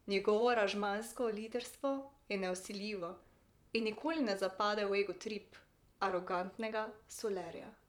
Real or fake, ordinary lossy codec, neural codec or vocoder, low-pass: fake; none; vocoder, 44.1 kHz, 128 mel bands, Pupu-Vocoder; 19.8 kHz